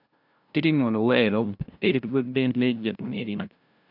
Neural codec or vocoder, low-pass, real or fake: codec, 16 kHz, 0.5 kbps, FunCodec, trained on LibriTTS, 25 frames a second; 5.4 kHz; fake